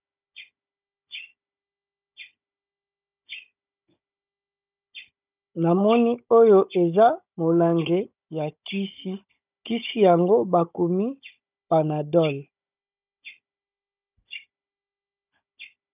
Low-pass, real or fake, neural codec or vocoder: 3.6 kHz; fake; codec, 16 kHz, 16 kbps, FunCodec, trained on Chinese and English, 50 frames a second